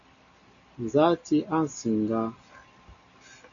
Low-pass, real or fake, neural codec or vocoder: 7.2 kHz; real; none